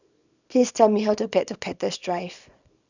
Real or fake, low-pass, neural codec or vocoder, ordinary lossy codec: fake; 7.2 kHz; codec, 24 kHz, 0.9 kbps, WavTokenizer, small release; none